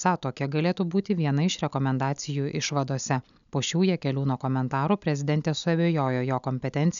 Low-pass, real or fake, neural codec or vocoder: 7.2 kHz; real; none